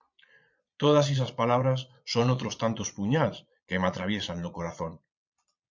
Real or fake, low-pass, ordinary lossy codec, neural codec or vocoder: fake; 7.2 kHz; MP3, 64 kbps; vocoder, 22.05 kHz, 80 mel bands, Vocos